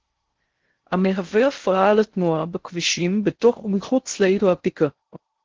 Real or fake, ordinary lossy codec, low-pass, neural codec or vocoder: fake; Opus, 16 kbps; 7.2 kHz; codec, 16 kHz in and 24 kHz out, 0.6 kbps, FocalCodec, streaming, 4096 codes